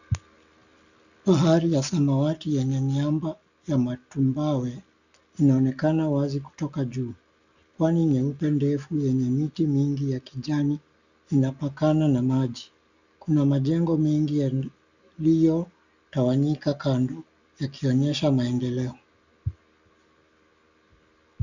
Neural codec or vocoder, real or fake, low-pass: none; real; 7.2 kHz